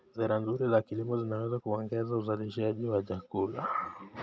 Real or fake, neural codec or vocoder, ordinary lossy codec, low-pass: real; none; none; none